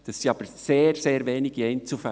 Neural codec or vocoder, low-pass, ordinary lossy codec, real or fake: none; none; none; real